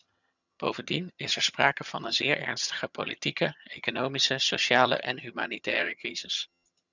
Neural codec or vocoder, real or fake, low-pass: vocoder, 22.05 kHz, 80 mel bands, HiFi-GAN; fake; 7.2 kHz